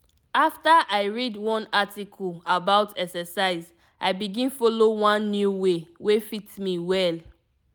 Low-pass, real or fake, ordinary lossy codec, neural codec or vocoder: none; real; none; none